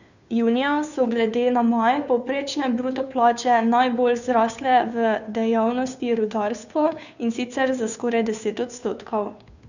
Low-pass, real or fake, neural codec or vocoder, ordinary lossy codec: 7.2 kHz; fake; codec, 16 kHz, 2 kbps, FunCodec, trained on Chinese and English, 25 frames a second; none